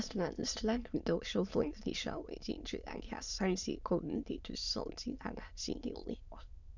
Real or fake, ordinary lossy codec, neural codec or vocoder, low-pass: fake; none; autoencoder, 22.05 kHz, a latent of 192 numbers a frame, VITS, trained on many speakers; 7.2 kHz